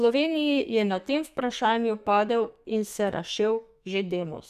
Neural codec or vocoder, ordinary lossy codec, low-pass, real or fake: codec, 32 kHz, 1.9 kbps, SNAC; none; 14.4 kHz; fake